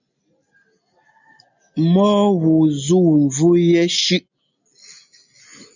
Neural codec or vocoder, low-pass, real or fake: none; 7.2 kHz; real